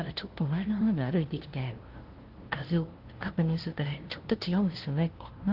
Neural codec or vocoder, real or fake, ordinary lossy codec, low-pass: codec, 16 kHz, 0.5 kbps, FunCodec, trained on LibriTTS, 25 frames a second; fake; Opus, 16 kbps; 5.4 kHz